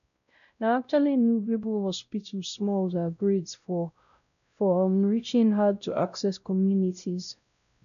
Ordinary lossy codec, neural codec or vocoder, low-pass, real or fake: none; codec, 16 kHz, 0.5 kbps, X-Codec, WavLM features, trained on Multilingual LibriSpeech; 7.2 kHz; fake